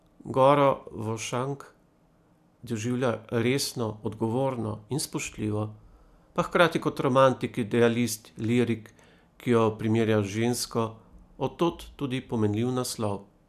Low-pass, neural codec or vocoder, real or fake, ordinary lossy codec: 14.4 kHz; none; real; none